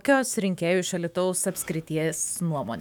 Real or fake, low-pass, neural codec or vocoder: fake; 19.8 kHz; autoencoder, 48 kHz, 128 numbers a frame, DAC-VAE, trained on Japanese speech